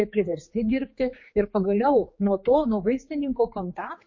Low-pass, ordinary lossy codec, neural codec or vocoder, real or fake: 7.2 kHz; MP3, 32 kbps; codec, 16 kHz, 4 kbps, X-Codec, HuBERT features, trained on general audio; fake